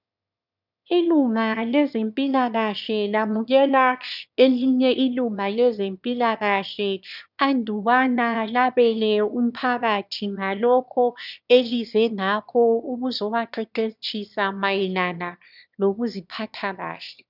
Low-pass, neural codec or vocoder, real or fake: 5.4 kHz; autoencoder, 22.05 kHz, a latent of 192 numbers a frame, VITS, trained on one speaker; fake